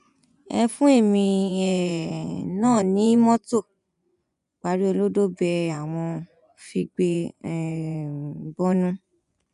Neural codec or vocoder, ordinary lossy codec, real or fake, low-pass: vocoder, 24 kHz, 100 mel bands, Vocos; none; fake; 10.8 kHz